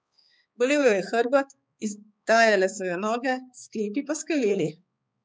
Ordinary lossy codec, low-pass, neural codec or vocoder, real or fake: none; none; codec, 16 kHz, 4 kbps, X-Codec, HuBERT features, trained on balanced general audio; fake